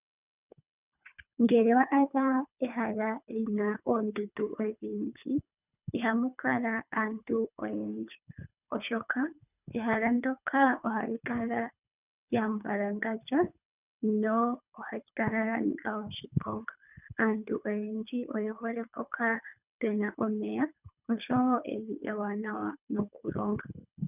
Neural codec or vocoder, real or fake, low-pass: codec, 24 kHz, 3 kbps, HILCodec; fake; 3.6 kHz